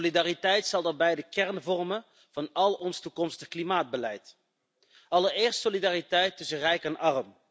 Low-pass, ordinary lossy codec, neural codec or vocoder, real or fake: none; none; none; real